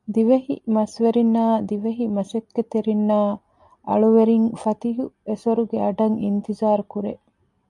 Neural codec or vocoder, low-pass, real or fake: none; 10.8 kHz; real